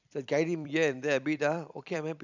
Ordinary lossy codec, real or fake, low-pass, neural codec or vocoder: none; fake; 7.2 kHz; vocoder, 44.1 kHz, 128 mel bands every 256 samples, BigVGAN v2